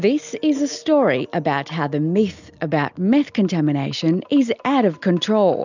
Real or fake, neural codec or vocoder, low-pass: real; none; 7.2 kHz